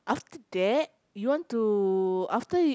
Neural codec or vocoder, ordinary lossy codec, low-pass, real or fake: none; none; none; real